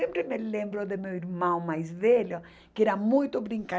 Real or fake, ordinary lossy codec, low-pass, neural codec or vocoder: real; none; none; none